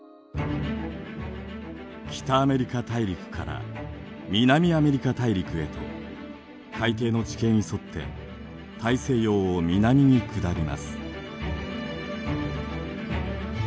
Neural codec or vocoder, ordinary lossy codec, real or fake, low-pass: none; none; real; none